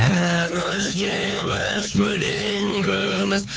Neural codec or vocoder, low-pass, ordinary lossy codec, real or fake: codec, 16 kHz, 4 kbps, X-Codec, HuBERT features, trained on LibriSpeech; none; none; fake